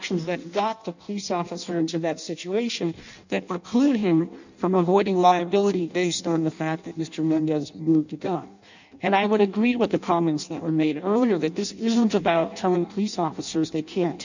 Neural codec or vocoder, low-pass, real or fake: codec, 16 kHz in and 24 kHz out, 0.6 kbps, FireRedTTS-2 codec; 7.2 kHz; fake